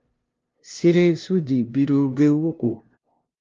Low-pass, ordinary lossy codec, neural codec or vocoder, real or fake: 7.2 kHz; Opus, 32 kbps; codec, 16 kHz, 0.5 kbps, FunCodec, trained on LibriTTS, 25 frames a second; fake